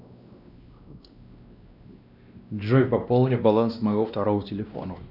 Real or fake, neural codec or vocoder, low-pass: fake; codec, 16 kHz, 1 kbps, X-Codec, WavLM features, trained on Multilingual LibriSpeech; 5.4 kHz